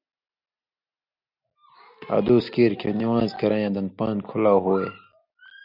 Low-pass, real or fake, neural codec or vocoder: 5.4 kHz; real; none